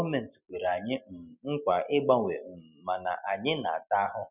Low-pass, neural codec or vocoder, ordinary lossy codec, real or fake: 3.6 kHz; none; none; real